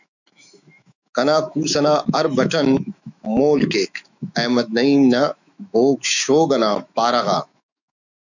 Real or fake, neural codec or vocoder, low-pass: fake; autoencoder, 48 kHz, 128 numbers a frame, DAC-VAE, trained on Japanese speech; 7.2 kHz